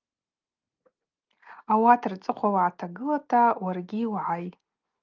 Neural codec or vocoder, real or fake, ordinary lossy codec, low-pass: none; real; Opus, 24 kbps; 7.2 kHz